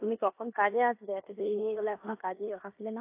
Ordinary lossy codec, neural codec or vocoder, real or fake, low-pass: AAC, 24 kbps; codec, 16 kHz in and 24 kHz out, 0.9 kbps, LongCat-Audio-Codec, four codebook decoder; fake; 3.6 kHz